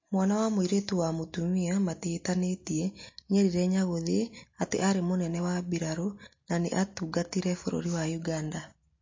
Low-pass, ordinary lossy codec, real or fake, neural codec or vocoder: 7.2 kHz; MP3, 32 kbps; real; none